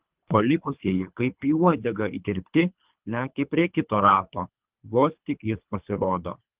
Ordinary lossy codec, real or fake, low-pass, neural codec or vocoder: Opus, 32 kbps; fake; 3.6 kHz; codec, 24 kHz, 3 kbps, HILCodec